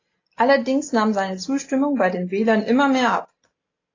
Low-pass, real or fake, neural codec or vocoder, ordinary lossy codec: 7.2 kHz; real; none; AAC, 32 kbps